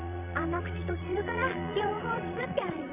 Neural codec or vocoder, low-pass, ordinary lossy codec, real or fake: vocoder, 22.05 kHz, 80 mel bands, Vocos; 3.6 kHz; none; fake